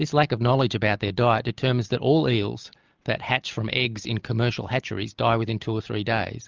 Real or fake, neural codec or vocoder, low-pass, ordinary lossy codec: real; none; 7.2 kHz; Opus, 24 kbps